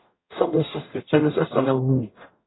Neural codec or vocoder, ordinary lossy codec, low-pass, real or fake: codec, 44.1 kHz, 0.9 kbps, DAC; AAC, 16 kbps; 7.2 kHz; fake